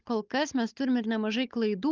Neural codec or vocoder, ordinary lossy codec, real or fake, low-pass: codec, 16 kHz, 16 kbps, FunCodec, trained on Chinese and English, 50 frames a second; Opus, 24 kbps; fake; 7.2 kHz